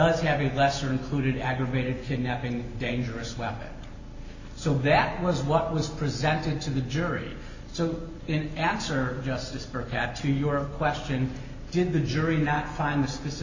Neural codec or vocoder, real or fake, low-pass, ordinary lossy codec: none; real; 7.2 kHz; Opus, 64 kbps